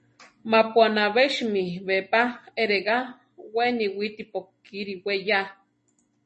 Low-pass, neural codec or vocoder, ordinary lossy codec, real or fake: 10.8 kHz; none; MP3, 32 kbps; real